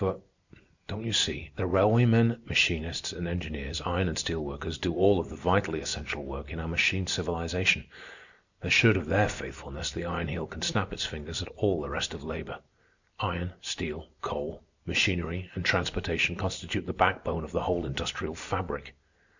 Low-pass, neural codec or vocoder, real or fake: 7.2 kHz; none; real